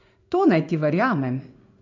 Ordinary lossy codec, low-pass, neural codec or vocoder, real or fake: none; 7.2 kHz; codec, 16 kHz in and 24 kHz out, 1 kbps, XY-Tokenizer; fake